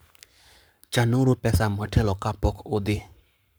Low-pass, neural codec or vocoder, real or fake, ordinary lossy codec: none; codec, 44.1 kHz, 7.8 kbps, Pupu-Codec; fake; none